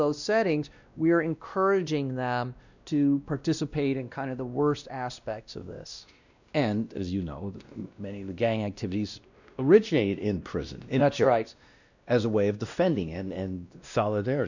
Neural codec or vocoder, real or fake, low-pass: codec, 16 kHz, 1 kbps, X-Codec, WavLM features, trained on Multilingual LibriSpeech; fake; 7.2 kHz